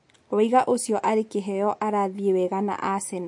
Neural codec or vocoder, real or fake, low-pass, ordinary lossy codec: none; real; 10.8 kHz; MP3, 48 kbps